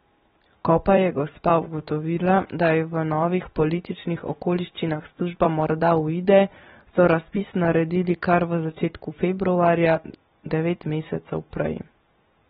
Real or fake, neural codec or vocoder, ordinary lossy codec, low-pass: fake; vocoder, 44.1 kHz, 128 mel bands every 256 samples, BigVGAN v2; AAC, 16 kbps; 19.8 kHz